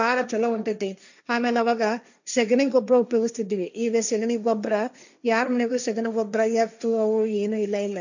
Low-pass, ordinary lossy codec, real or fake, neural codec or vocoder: 7.2 kHz; none; fake; codec, 16 kHz, 1.1 kbps, Voila-Tokenizer